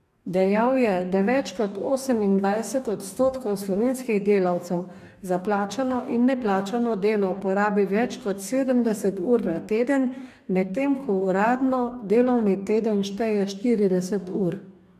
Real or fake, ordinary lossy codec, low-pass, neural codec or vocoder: fake; AAC, 96 kbps; 14.4 kHz; codec, 44.1 kHz, 2.6 kbps, DAC